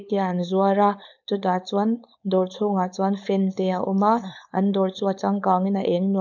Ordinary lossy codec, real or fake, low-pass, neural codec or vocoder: none; fake; 7.2 kHz; codec, 16 kHz, 4.8 kbps, FACodec